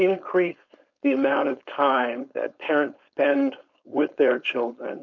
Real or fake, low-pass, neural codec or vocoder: fake; 7.2 kHz; codec, 16 kHz, 4.8 kbps, FACodec